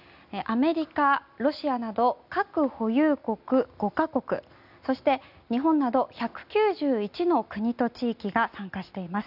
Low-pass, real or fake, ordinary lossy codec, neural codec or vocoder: 5.4 kHz; real; none; none